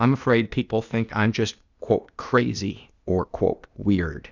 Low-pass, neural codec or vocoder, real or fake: 7.2 kHz; codec, 16 kHz, 0.8 kbps, ZipCodec; fake